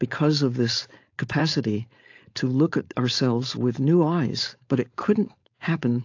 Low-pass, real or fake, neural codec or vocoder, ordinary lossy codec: 7.2 kHz; fake; codec, 16 kHz, 4.8 kbps, FACodec; AAC, 48 kbps